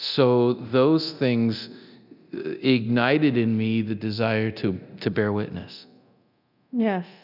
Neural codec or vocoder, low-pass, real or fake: codec, 24 kHz, 0.9 kbps, DualCodec; 5.4 kHz; fake